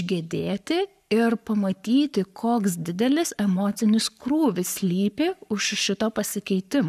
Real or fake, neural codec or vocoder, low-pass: fake; codec, 44.1 kHz, 7.8 kbps, Pupu-Codec; 14.4 kHz